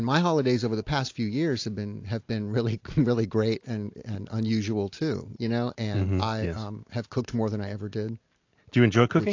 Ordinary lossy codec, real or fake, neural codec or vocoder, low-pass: AAC, 48 kbps; real; none; 7.2 kHz